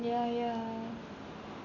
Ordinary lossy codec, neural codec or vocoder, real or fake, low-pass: none; none; real; 7.2 kHz